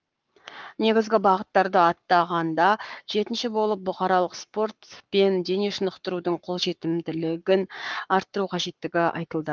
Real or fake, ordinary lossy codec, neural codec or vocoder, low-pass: fake; Opus, 32 kbps; codec, 44.1 kHz, 7.8 kbps, Pupu-Codec; 7.2 kHz